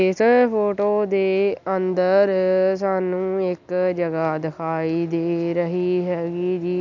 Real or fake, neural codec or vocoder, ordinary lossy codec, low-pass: real; none; none; 7.2 kHz